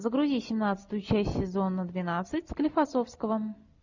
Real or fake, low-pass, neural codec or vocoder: real; 7.2 kHz; none